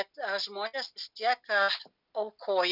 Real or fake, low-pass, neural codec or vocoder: real; 5.4 kHz; none